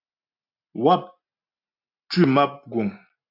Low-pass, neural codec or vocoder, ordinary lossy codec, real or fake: 5.4 kHz; none; AAC, 32 kbps; real